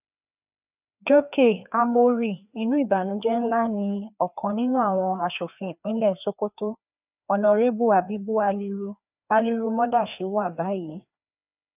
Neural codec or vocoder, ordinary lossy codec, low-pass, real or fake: codec, 16 kHz, 2 kbps, FreqCodec, larger model; none; 3.6 kHz; fake